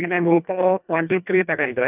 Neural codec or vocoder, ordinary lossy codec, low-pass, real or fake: codec, 24 kHz, 1.5 kbps, HILCodec; none; 3.6 kHz; fake